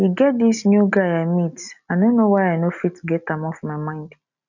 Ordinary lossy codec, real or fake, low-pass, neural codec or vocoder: none; real; 7.2 kHz; none